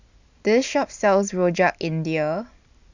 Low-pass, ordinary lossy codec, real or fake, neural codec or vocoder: 7.2 kHz; none; real; none